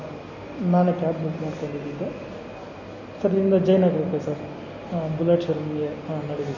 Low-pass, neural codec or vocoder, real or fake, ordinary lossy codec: 7.2 kHz; none; real; none